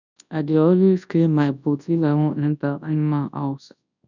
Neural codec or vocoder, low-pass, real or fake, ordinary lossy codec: codec, 24 kHz, 0.9 kbps, WavTokenizer, large speech release; 7.2 kHz; fake; none